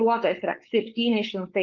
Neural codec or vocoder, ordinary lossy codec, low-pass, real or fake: codec, 16 kHz, 4.8 kbps, FACodec; Opus, 32 kbps; 7.2 kHz; fake